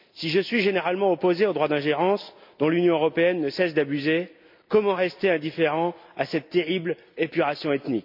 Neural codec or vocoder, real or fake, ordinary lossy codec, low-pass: none; real; MP3, 48 kbps; 5.4 kHz